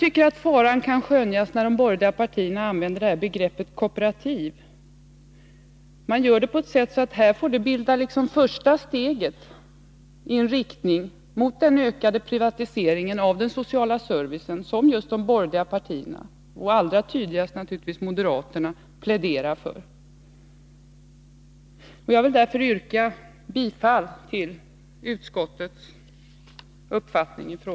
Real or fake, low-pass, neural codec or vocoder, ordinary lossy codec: real; none; none; none